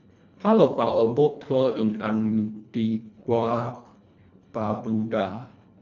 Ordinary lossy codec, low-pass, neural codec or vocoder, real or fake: none; 7.2 kHz; codec, 24 kHz, 1.5 kbps, HILCodec; fake